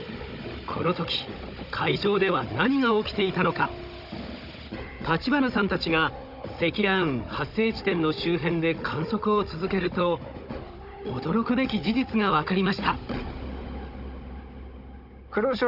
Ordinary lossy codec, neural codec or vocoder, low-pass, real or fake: none; codec, 16 kHz, 16 kbps, FunCodec, trained on Chinese and English, 50 frames a second; 5.4 kHz; fake